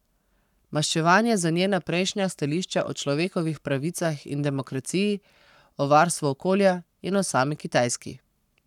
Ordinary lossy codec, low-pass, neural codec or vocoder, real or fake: none; 19.8 kHz; codec, 44.1 kHz, 7.8 kbps, Pupu-Codec; fake